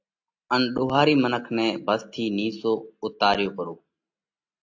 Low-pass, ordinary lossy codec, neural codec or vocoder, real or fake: 7.2 kHz; AAC, 48 kbps; none; real